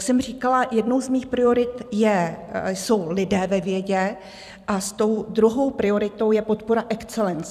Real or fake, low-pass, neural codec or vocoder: fake; 14.4 kHz; vocoder, 44.1 kHz, 128 mel bands every 256 samples, BigVGAN v2